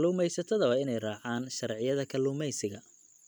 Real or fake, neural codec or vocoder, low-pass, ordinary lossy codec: real; none; 19.8 kHz; none